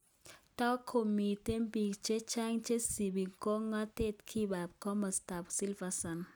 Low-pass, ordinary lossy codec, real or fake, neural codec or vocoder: none; none; real; none